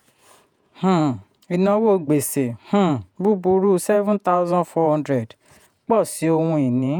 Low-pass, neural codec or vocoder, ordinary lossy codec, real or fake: 19.8 kHz; vocoder, 48 kHz, 128 mel bands, Vocos; none; fake